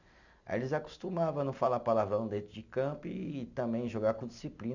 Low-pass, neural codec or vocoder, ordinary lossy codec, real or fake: 7.2 kHz; none; none; real